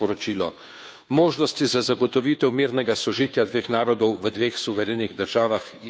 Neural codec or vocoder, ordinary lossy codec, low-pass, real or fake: codec, 16 kHz, 2 kbps, FunCodec, trained on Chinese and English, 25 frames a second; none; none; fake